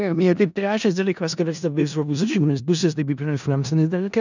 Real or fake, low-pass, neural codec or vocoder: fake; 7.2 kHz; codec, 16 kHz in and 24 kHz out, 0.4 kbps, LongCat-Audio-Codec, four codebook decoder